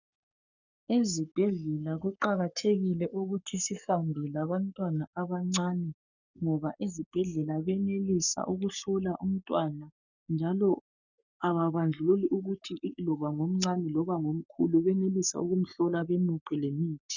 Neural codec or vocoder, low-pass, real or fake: codec, 44.1 kHz, 7.8 kbps, Pupu-Codec; 7.2 kHz; fake